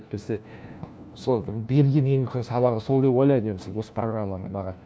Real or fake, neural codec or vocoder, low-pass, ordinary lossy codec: fake; codec, 16 kHz, 1 kbps, FunCodec, trained on LibriTTS, 50 frames a second; none; none